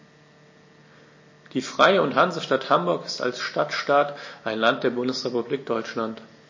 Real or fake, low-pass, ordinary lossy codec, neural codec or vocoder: real; 7.2 kHz; MP3, 32 kbps; none